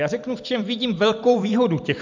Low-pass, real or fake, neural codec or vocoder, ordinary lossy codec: 7.2 kHz; fake; vocoder, 44.1 kHz, 128 mel bands every 256 samples, BigVGAN v2; MP3, 64 kbps